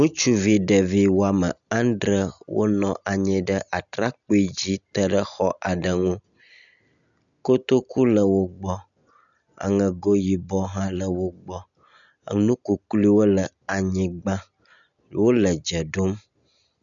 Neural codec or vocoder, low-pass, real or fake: none; 7.2 kHz; real